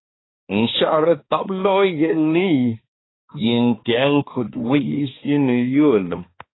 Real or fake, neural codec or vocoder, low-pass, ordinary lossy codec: fake; codec, 16 kHz, 2 kbps, X-Codec, HuBERT features, trained on balanced general audio; 7.2 kHz; AAC, 16 kbps